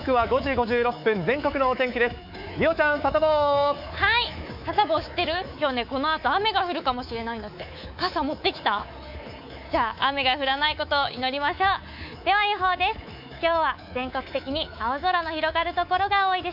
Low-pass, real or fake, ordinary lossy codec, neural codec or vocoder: 5.4 kHz; fake; none; codec, 24 kHz, 3.1 kbps, DualCodec